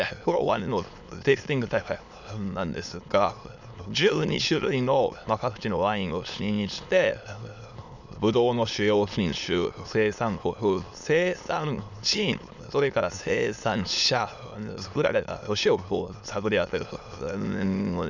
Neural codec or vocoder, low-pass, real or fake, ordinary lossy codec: autoencoder, 22.05 kHz, a latent of 192 numbers a frame, VITS, trained on many speakers; 7.2 kHz; fake; none